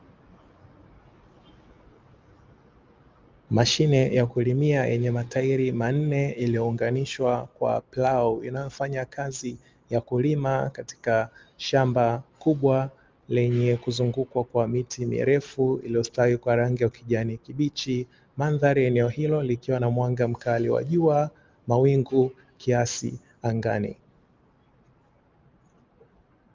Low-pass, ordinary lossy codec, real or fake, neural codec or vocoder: 7.2 kHz; Opus, 24 kbps; real; none